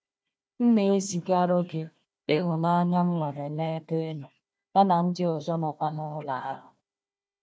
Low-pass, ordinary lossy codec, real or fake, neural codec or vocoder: none; none; fake; codec, 16 kHz, 1 kbps, FunCodec, trained on Chinese and English, 50 frames a second